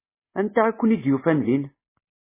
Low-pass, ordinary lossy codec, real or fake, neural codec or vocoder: 3.6 kHz; MP3, 16 kbps; real; none